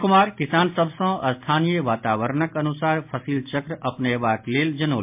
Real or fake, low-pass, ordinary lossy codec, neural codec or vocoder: real; 3.6 kHz; MP3, 32 kbps; none